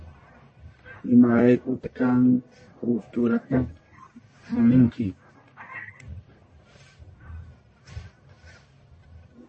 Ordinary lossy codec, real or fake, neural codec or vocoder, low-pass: MP3, 32 kbps; fake; codec, 44.1 kHz, 1.7 kbps, Pupu-Codec; 10.8 kHz